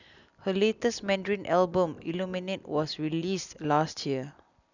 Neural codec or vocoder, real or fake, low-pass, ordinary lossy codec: none; real; 7.2 kHz; none